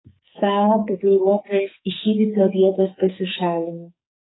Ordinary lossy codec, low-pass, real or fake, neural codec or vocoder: AAC, 16 kbps; 7.2 kHz; fake; codec, 44.1 kHz, 2.6 kbps, SNAC